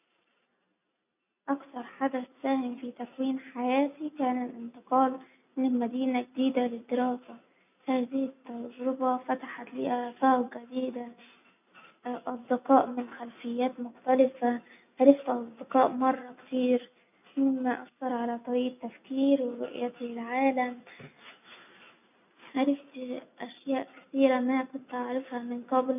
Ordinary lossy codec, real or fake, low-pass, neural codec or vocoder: none; real; 3.6 kHz; none